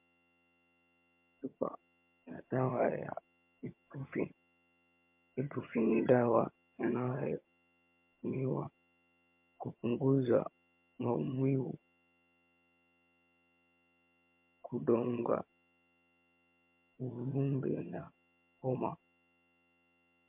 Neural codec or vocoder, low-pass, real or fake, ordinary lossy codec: vocoder, 22.05 kHz, 80 mel bands, HiFi-GAN; 3.6 kHz; fake; MP3, 32 kbps